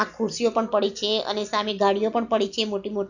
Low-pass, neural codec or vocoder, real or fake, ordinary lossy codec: 7.2 kHz; none; real; none